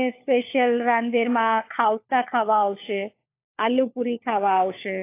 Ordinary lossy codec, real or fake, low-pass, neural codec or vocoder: AAC, 24 kbps; fake; 3.6 kHz; codec, 16 kHz, 4 kbps, FunCodec, trained on LibriTTS, 50 frames a second